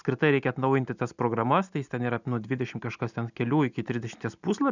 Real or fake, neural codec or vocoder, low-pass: real; none; 7.2 kHz